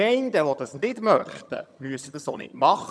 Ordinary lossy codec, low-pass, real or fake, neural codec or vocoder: none; none; fake; vocoder, 22.05 kHz, 80 mel bands, HiFi-GAN